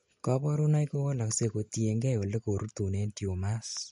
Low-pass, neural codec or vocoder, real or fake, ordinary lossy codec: 19.8 kHz; none; real; MP3, 48 kbps